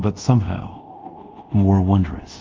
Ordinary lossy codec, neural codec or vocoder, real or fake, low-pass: Opus, 32 kbps; codec, 24 kHz, 0.5 kbps, DualCodec; fake; 7.2 kHz